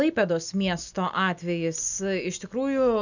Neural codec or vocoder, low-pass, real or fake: none; 7.2 kHz; real